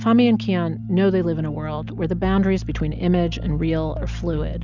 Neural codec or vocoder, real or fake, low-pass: none; real; 7.2 kHz